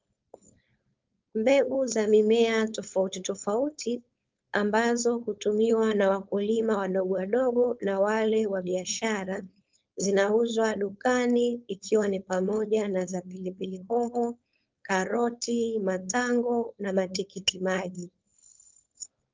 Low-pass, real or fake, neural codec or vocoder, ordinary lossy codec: 7.2 kHz; fake; codec, 16 kHz, 4.8 kbps, FACodec; Opus, 24 kbps